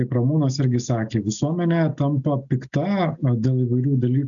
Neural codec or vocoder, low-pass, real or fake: none; 7.2 kHz; real